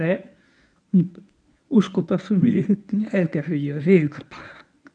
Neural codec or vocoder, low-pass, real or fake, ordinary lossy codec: codec, 24 kHz, 0.9 kbps, WavTokenizer, medium speech release version 1; 9.9 kHz; fake; none